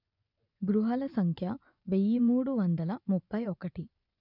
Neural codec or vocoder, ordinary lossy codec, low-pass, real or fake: vocoder, 24 kHz, 100 mel bands, Vocos; none; 5.4 kHz; fake